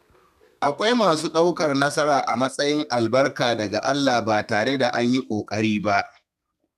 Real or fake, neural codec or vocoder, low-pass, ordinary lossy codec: fake; codec, 32 kHz, 1.9 kbps, SNAC; 14.4 kHz; none